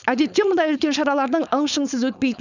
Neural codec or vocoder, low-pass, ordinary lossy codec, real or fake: codec, 16 kHz, 4.8 kbps, FACodec; 7.2 kHz; none; fake